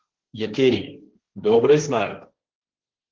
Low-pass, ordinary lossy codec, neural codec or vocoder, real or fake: 7.2 kHz; Opus, 16 kbps; codec, 16 kHz, 1.1 kbps, Voila-Tokenizer; fake